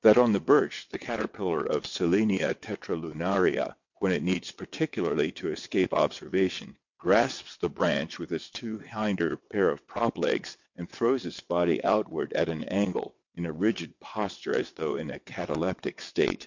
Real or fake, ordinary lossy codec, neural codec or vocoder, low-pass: fake; MP3, 48 kbps; vocoder, 22.05 kHz, 80 mel bands, WaveNeXt; 7.2 kHz